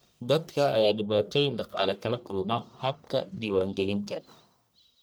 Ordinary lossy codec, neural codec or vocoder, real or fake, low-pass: none; codec, 44.1 kHz, 1.7 kbps, Pupu-Codec; fake; none